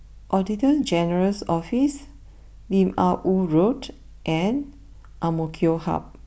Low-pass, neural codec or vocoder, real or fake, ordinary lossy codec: none; none; real; none